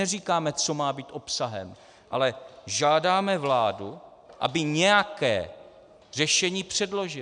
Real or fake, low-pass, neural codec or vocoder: real; 9.9 kHz; none